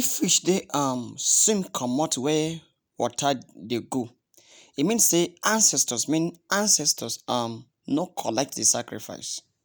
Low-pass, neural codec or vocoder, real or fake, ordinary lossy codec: none; none; real; none